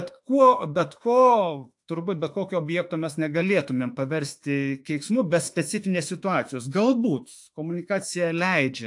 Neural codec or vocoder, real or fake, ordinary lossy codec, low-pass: autoencoder, 48 kHz, 32 numbers a frame, DAC-VAE, trained on Japanese speech; fake; AAC, 64 kbps; 10.8 kHz